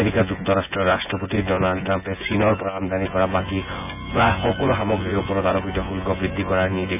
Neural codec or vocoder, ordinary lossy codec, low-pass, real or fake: vocoder, 24 kHz, 100 mel bands, Vocos; none; 3.6 kHz; fake